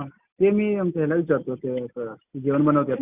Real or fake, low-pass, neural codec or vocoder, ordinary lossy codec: real; 3.6 kHz; none; Opus, 64 kbps